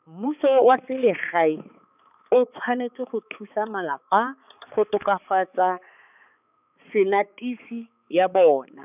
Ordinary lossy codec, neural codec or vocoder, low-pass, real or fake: none; codec, 16 kHz, 4 kbps, X-Codec, HuBERT features, trained on balanced general audio; 3.6 kHz; fake